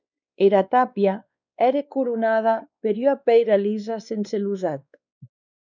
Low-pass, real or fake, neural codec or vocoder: 7.2 kHz; fake; codec, 16 kHz, 2 kbps, X-Codec, WavLM features, trained on Multilingual LibriSpeech